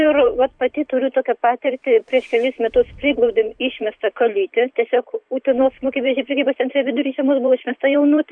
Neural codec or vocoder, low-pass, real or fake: vocoder, 44.1 kHz, 128 mel bands every 512 samples, BigVGAN v2; 9.9 kHz; fake